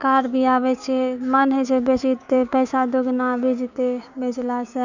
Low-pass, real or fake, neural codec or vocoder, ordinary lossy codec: 7.2 kHz; fake; codec, 16 kHz, 6 kbps, DAC; none